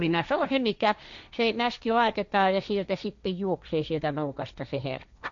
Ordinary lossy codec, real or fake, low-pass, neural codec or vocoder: none; fake; 7.2 kHz; codec, 16 kHz, 1.1 kbps, Voila-Tokenizer